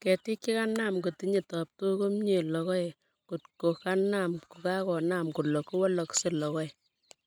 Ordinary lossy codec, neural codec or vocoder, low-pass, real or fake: none; none; 19.8 kHz; real